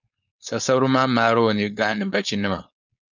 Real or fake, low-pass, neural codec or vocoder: fake; 7.2 kHz; codec, 16 kHz, 4.8 kbps, FACodec